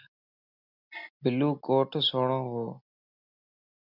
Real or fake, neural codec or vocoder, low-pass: real; none; 5.4 kHz